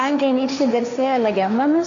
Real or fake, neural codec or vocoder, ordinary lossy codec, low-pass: fake; codec, 16 kHz, 1.1 kbps, Voila-Tokenizer; AAC, 48 kbps; 7.2 kHz